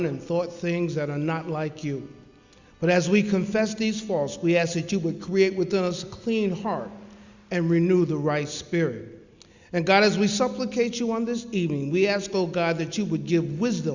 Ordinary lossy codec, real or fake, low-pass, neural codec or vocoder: Opus, 64 kbps; real; 7.2 kHz; none